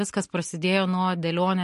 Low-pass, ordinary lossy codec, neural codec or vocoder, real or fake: 14.4 kHz; MP3, 48 kbps; none; real